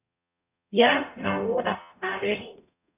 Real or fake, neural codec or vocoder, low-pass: fake; codec, 44.1 kHz, 0.9 kbps, DAC; 3.6 kHz